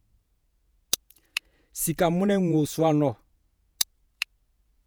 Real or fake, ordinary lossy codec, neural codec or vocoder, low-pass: fake; none; vocoder, 48 kHz, 128 mel bands, Vocos; none